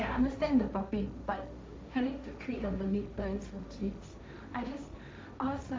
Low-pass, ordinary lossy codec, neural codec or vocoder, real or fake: none; none; codec, 16 kHz, 1.1 kbps, Voila-Tokenizer; fake